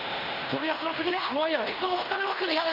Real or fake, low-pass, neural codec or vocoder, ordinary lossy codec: fake; 5.4 kHz; codec, 16 kHz in and 24 kHz out, 0.9 kbps, LongCat-Audio-Codec, fine tuned four codebook decoder; none